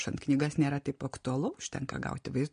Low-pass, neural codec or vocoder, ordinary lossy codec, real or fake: 9.9 kHz; none; MP3, 48 kbps; real